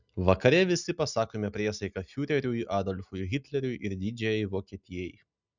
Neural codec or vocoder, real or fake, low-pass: none; real; 7.2 kHz